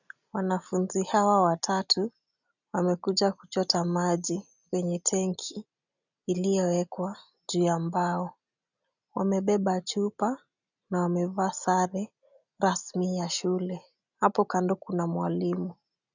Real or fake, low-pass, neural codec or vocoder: real; 7.2 kHz; none